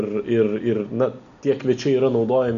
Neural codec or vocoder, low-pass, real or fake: none; 7.2 kHz; real